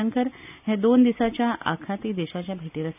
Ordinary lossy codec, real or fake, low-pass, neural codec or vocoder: none; real; 3.6 kHz; none